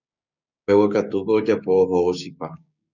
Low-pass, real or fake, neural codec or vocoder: 7.2 kHz; fake; codec, 16 kHz, 6 kbps, DAC